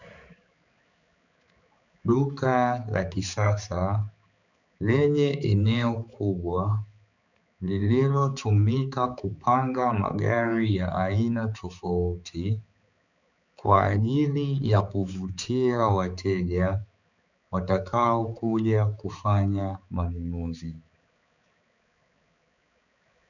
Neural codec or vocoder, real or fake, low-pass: codec, 16 kHz, 4 kbps, X-Codec, HuBERT features, trained on balanced general audio; fake; 7.2 kHz